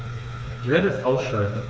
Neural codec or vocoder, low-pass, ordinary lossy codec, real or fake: codec, 16 kHz, 4 kbps, FreqCodec, smaller model; none; none; fake